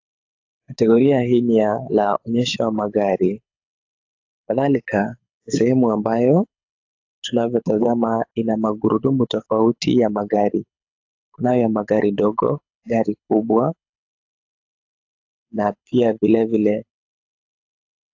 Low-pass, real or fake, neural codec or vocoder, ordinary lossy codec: 7.2 kHz; fake; codec, 24 kHz, 6 kbps, HILCodec; AAC, 48 kbps